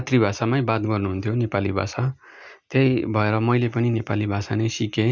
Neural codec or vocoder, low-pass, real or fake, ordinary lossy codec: none; none; real; none